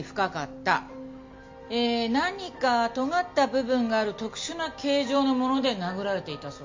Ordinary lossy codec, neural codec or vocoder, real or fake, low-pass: MP3, 48 kbps; none; real; 7.2 kHz